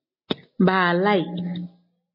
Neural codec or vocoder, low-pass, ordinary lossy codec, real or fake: none; 5.4 kHz; MP3, 24 kbps; real